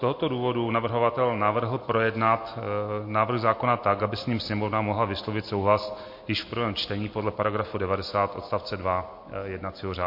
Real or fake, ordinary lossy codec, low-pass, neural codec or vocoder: real; MP3, 32 kbps; 5.4 kHz; none